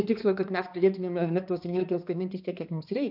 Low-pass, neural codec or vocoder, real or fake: 5.4 kHz; codec, 16 kHz, 2 kbps, X-Codec, HuBERT features, trained on balanced general audio; fake